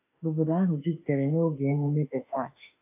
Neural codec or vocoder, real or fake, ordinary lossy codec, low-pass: autoencoder, 48 kHz, 32 numbers a frame, DAC-VAE, trained on Japanese speech; fake; AAC, 32 kbps; 3.6 kHz